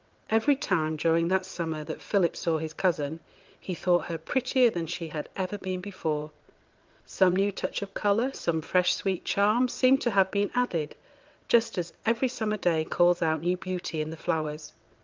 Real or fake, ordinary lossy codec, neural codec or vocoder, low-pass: fake; Opus, 24 kbps; vocoder, 22.05 kHz, 80 mel bands, WaveNeXt; 7.2 kHz